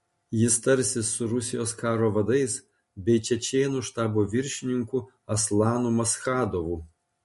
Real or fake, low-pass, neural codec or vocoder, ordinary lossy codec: real; 14.4 kHz; none; MP3, 48 kbps